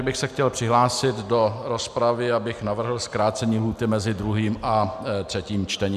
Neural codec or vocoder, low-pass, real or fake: none; 14.4 kHz; real